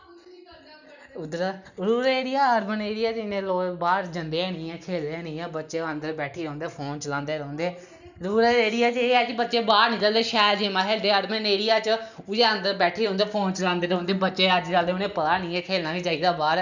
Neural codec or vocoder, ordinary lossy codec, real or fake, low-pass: none; none; real; 7.2 kHz